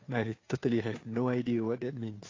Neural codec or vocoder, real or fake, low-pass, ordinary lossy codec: codec, 16 kHz, 2 kbps, FunCodec, trained on Chinese and English, 25 frames a second; fake; 7.2 kHz; AAC, 32 kbps